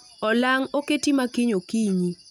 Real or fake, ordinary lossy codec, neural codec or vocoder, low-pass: real; none; none; 19.8 kHz